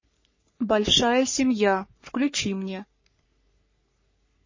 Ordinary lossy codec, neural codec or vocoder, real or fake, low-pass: MP3, 32 kbps; codec, 44.1 kHz, 3.4 kbps, Pupu-Codec; fake; 7.2 kHz